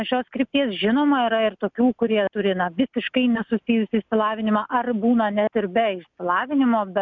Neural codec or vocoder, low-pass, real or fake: none; 7.2 kHz; real